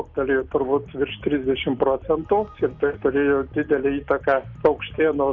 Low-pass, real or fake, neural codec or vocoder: 7.2 kHz; real; none